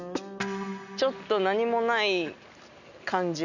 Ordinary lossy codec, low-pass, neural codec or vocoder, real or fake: none; 7.2 kHz; none; real